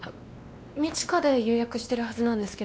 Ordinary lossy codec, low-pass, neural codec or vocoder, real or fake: none; none; codec, 16 kHz, 2 kbps, X-Codec, WavLM features, trained on Multilingual LibriSpeech; fake